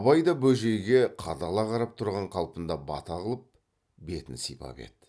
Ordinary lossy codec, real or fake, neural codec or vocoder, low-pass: none; real; none; none